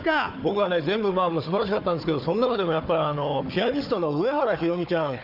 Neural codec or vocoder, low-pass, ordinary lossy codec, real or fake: codec, 16 kHz, 4 kbps, FunCodec, trained on Chinese and English, 50 frames a second; 5.4 kHz; none; fake